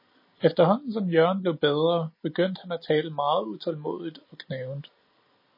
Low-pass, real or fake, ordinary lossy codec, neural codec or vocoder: 5.4 kHz; real; MP3, 24 kbps; none